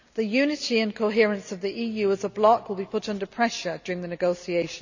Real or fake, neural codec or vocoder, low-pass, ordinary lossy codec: real; none; 7.2 kHz; none